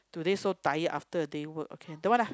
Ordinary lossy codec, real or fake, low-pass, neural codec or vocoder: none; real; none; none